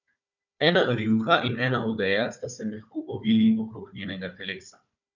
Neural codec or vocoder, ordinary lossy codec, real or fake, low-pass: codec, 16 kHz, 4 kbps, FunCodec, trained on Chinese and English, 50 frames a second; none; fake; 7.2 kHz